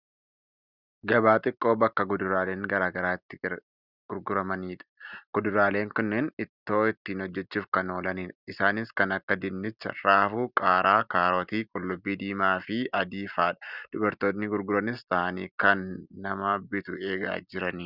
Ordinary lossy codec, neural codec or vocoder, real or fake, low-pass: Opus, 64 kbps; none; real; 5.4 kHz